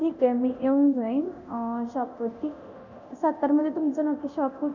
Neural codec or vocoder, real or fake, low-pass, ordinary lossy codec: codec, 24 kHz, 0.9 kbps, DualCodec; fake; 7.2 kHz; none